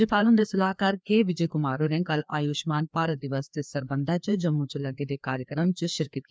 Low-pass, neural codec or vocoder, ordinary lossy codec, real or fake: none; codec, 16 kHz, 2 kbps, FreqCodec, larger model; none; fake